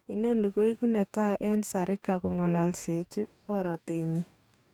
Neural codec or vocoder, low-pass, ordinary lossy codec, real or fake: codec, 44.1 kHz, 2.6 kbps, DAC; 19.8 kHz; none; fake